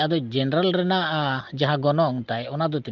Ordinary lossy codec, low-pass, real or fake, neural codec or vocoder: Opus, 32 kbps; 7.2 kHz; real; none